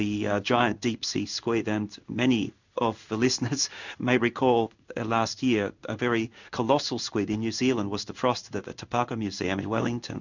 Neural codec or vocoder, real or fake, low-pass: codec, 16 kHz in and 24 kHz out, 1 kbps, XY-Tokenizer; fake; 7.2 kHz